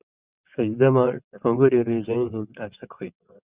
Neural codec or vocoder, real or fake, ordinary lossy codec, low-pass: vocoder, 22.05 kHz, 80 mel bands, WaveNeXt; fake; Opus, 64 kbps; 3.6 kHz